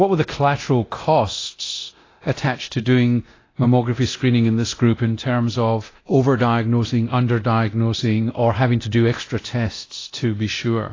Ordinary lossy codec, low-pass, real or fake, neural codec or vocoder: AAC, 32 kbps; 7.2 kHz; fake; codec, 24 kHz, 0.9 kbps, DualCodec